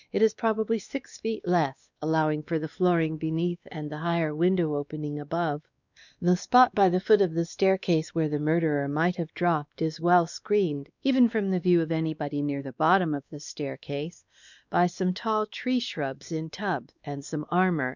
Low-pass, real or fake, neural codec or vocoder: 7.2 kHz; fake; codec, 16 kHz, 2 kbps, X-Codec, WavLM features, trained on Multilingual LibriSpeech